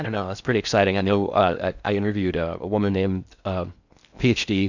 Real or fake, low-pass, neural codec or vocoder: fake; 7.2 kHz; codec, 16 kHz in and 24 kHz out, 0.6 kbps, FocalCodec, streaming, 2048 codes